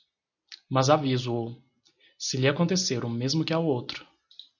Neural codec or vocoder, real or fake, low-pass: none; real; 7.2 kHz